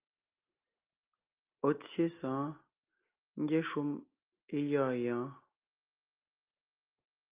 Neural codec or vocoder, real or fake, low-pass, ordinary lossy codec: none; real; 3.6 kHz; Opus, 24 kbps